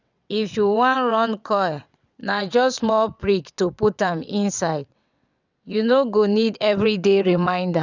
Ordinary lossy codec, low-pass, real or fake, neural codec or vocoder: none; 7.2 kHz; fake; vocoder, 22.05 kHz, 80 mel bands, WaveNeXt